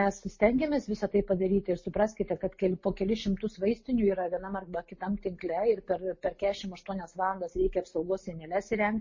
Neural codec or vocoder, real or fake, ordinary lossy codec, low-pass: none; real; MP3, 32 kbps; 7.2 kHz